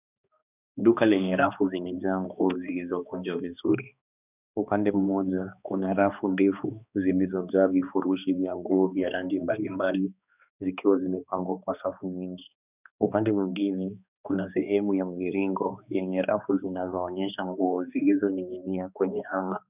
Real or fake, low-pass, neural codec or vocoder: fake; 3.6 kHz; codec, 16 kHz, 2 kbps, X-Codec, HuBERT features, trained on balanced general audio